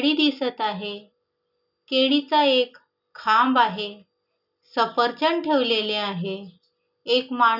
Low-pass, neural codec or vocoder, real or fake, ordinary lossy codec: 5.4 kHz; none; real; none